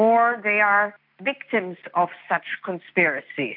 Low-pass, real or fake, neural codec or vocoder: 5.4 kHz; real; none